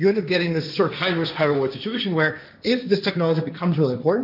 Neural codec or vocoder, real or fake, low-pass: codec, 16 kHz, 1.1 kbps, Voila-Tokenizer; fake; 5.4 kHz